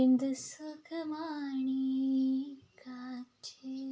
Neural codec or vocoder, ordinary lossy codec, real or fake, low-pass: none; none; real; none